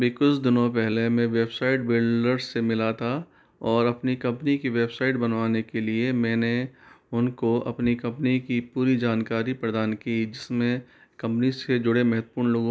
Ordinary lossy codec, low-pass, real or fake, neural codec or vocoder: none; none; real; none